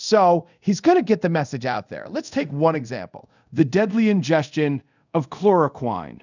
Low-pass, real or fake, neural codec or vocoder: 7.2 kHz; fake; codec, 24 kHz, 0.5 kbps, DualCodec